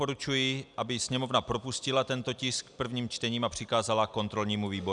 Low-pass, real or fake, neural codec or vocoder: 10.8 kHz; real; none